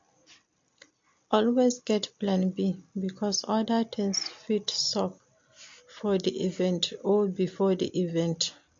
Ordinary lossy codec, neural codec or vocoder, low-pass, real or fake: MP3, 48 kbps; none; 7.2 kHz; real